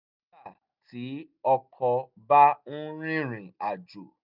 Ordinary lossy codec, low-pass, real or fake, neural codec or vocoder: none; 5.4 kHz; real; none